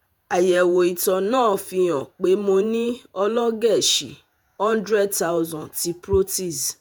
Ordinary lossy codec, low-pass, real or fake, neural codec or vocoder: none; none; fake; vocoder, 48 kHz, 128 mel bands, Vocos